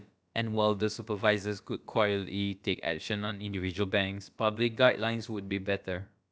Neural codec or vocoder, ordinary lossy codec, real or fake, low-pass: codec, 16 kHz, about 1 kbps, DyCAST, with the encoder's durations; none; fake; none